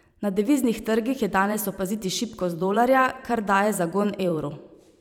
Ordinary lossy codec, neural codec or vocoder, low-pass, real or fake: none; vocoder, 44.1 kHz, 128 mel bands every 512 samples, BigVGAN v2; 19.8 kHz; fake